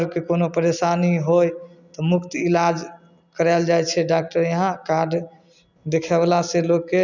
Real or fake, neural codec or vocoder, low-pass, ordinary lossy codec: real; none; 7.2 kHz; none